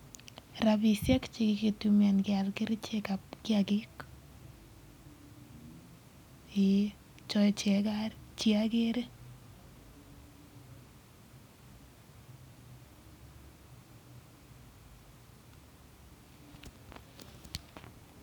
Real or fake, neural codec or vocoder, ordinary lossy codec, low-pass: real; none; none; 19.8 kHz